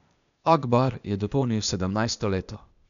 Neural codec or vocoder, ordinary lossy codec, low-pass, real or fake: codec, 16 kHz, 0.8 kbps, ZipCodec; none; 7.2 kHz; fake